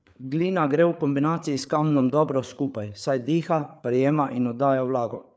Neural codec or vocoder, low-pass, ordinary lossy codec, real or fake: codec, 16 kHz, 4 kbps, FreqCodec, larger model; none; none; fake